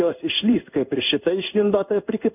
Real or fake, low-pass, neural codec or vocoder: fake; 3.6 kHz; codec, 16 kHz in and 24 kHz out, 1 kbps, XY-Tokenizer